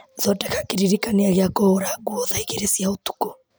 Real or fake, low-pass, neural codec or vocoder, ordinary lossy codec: real; none; none; none